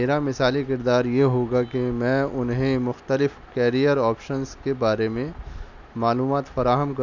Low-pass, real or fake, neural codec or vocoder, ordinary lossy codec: 7.2 kHz; real; none; none